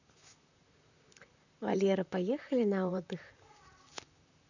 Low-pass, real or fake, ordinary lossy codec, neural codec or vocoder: 7.2 kHz; fake; none; vocoder, 44.1 kHz, 128 mel bands, Pupu-Vocoder